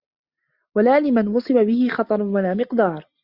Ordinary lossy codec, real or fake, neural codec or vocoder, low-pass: Opus, 64 kbps; real; none; 5.4 kHz